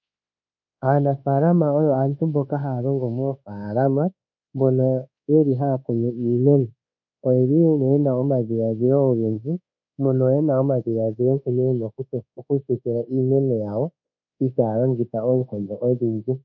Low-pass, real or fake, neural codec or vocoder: 7.2 kHz; fake; codec, 24 kHz, 1.2 kbps, DualCodec